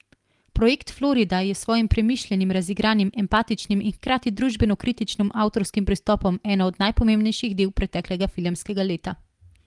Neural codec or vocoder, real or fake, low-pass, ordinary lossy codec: none; real; 10.8 kHz; Opus, 24 kbps